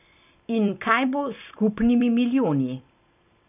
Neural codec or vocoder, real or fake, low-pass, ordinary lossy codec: vocoder, 44.1 kHz, 128 mel bands every 256 samples, BigVGAN v2; fake; 3.6 kHz; none